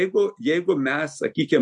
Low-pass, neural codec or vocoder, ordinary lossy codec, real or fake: 10.8 kHz; none; MP3, 64 kbps; real